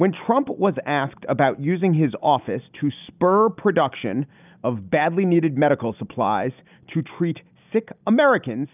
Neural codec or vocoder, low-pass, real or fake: none; 3.6 kHz; real